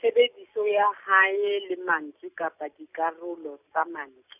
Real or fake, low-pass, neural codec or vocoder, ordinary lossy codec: real; 3.6 kHz; none; none